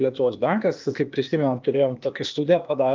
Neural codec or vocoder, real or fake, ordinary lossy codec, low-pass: codec, 16 kHz, 0.8 kbps, ZipCodec; fake; Opus, 32 kbps; 7.2 kHz